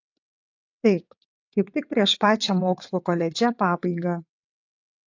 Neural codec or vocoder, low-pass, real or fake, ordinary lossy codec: vocoder, 22.05 kHz, 80 mel bands, WaveNeXt; 7.2 kHz; fake; AAC, 48 kbps